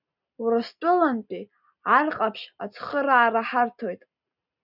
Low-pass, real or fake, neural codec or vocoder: 5.4 kHz; real; none